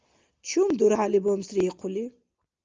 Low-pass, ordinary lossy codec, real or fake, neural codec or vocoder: 7.2 kHz; Opus, 24 kbps; real; none